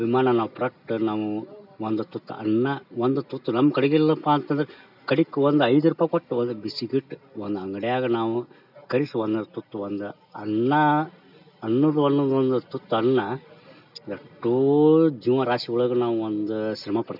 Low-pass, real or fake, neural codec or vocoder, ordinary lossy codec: 5.4 kHz; real; none; none